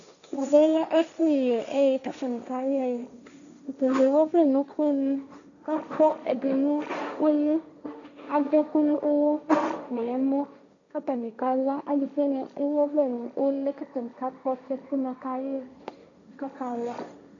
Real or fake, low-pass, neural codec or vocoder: fake; 7.2 kHz; codec, 16 kHz, 1.1 kbps, Voila-Tokenizer